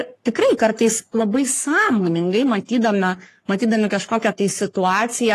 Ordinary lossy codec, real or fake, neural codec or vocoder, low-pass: AAC, 48 kbps; fake; codec, 44.1 kHz, 3.4 kbps, Pupu-Codec; 14.4 kHz